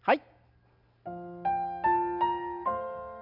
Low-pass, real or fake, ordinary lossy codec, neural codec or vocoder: 5.4 kHz; real; none; none